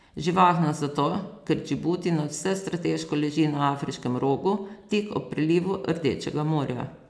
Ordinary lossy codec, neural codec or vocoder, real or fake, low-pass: none; none; real; none